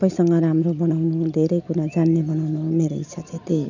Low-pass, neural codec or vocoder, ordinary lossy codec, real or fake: 7.2 kHz; none; none; real